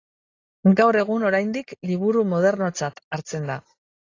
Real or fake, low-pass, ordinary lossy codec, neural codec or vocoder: real; 7.2 kHz; AAC, 32 kbps; none